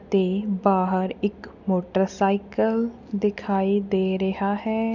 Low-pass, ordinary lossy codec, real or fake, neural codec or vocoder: 7.2 kHz; none; real; none